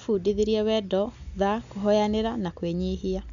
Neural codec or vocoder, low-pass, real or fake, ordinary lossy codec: none; 7.2 kHz; real; none